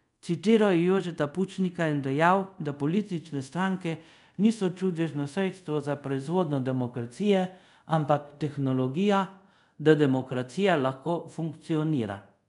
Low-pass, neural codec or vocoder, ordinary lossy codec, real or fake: 10.8 kHz; codec, 24 kHz, 0.5 kbps, DualCodec; none; fake